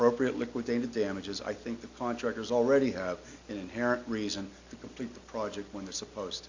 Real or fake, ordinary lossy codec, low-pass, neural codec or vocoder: real; AAC, 48 kbps; 7.2 kHz; none